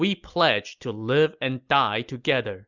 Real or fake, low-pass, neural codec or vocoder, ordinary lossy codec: real; 7.2 kHz; none; Opus, 64 kbps